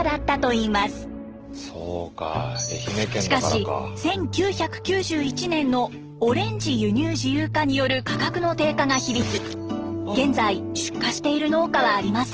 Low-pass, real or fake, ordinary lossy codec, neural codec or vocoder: 7.2 kHz; real; Opus, 16 kbps; none